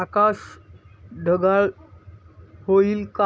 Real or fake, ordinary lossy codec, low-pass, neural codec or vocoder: real; none; none; none